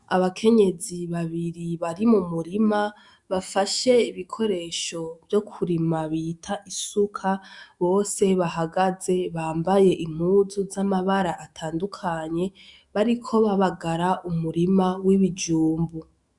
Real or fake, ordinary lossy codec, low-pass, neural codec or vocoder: fake; Opus, 64 kbps; 10.8 kHz; autoencoder, 48 kHz, 128 numbers a frame, DAC-VAE, trained on Japanese speech